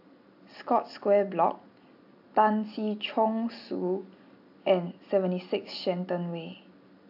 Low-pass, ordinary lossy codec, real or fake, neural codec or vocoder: 5.4 kHz; AAC, 48 kbps; real; none